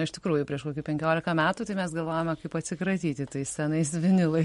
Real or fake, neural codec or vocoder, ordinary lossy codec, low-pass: real; none; MP3, 48 kbps; 19.8 kHz